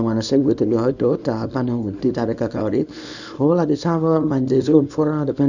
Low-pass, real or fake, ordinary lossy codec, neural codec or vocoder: 7.2 kHz; fake; none; codec, 24 kHz, 0.9 kbps, WavTokenizer, small release